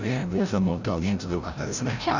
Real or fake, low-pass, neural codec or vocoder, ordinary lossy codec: fake; 7.2 kHz; codec, 16 kHz, 0.5 kbps, FreqCodec, larger model; none